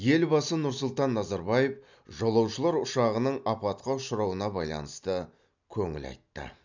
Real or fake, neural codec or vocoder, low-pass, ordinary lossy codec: real; none; 7.2 kHz; none